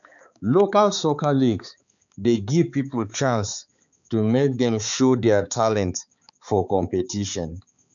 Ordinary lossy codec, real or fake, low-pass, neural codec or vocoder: none; fake; 7.2 kHz; codec, 16 kHz, 4 kbps, X-Codec, HuBERT features, trained on balanced general audio